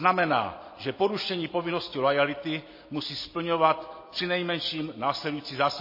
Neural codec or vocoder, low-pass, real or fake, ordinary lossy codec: none; 5.4 kHz; real; MP3, 24 kbps